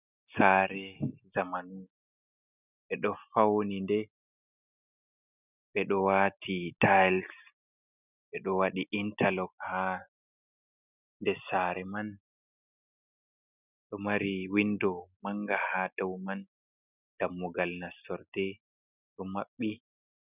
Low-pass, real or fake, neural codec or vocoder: 3.6 kHz; real; none